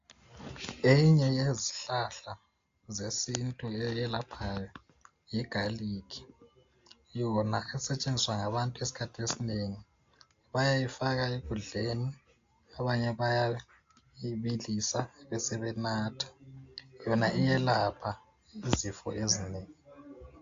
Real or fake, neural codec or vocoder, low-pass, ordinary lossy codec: real; none; 7.2 kHz; AAC, 48 kbps